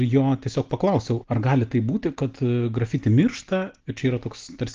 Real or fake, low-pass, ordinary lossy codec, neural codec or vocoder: real; 7.2 kHz; Opus, 16 kbps; none